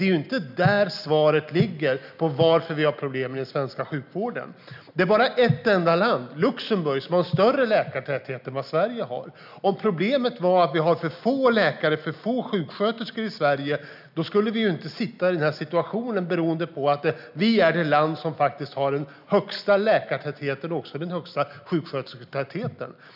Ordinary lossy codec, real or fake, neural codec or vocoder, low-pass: none; real; none; 5.4 kHz